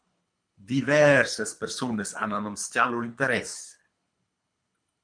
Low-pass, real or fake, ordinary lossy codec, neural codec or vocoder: 9.9 kHz; fake; MP3, 64 kbps; codec, 24 kHz, 3 kbps, HILCodec